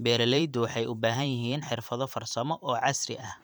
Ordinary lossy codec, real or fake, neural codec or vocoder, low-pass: none; fake; vocoder, 44.1 kHz, 128 mel bands every 512 samples, BigVGAN v2; none